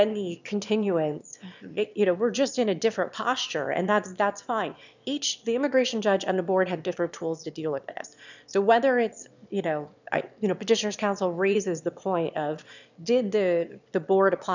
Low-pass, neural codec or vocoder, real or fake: 7.2 kHz; autoencoder, 22.05 kHz, a latent of 192 numbers a frame, VITS, trained on one speaker; fake